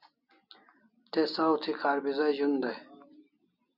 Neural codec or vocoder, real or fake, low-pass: none; real; 5.4 kHz